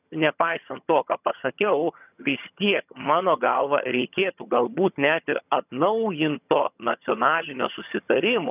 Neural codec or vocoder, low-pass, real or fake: vocoder, 22.05 kHz, 80 mel bands, HiFi-GAN; 3.6 kHz; fake